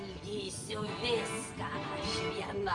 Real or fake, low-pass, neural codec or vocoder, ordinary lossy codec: real; 10.8 kHz; none; AAC, 64 kbps